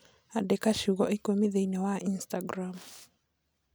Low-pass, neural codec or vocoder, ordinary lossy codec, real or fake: none; none; none; real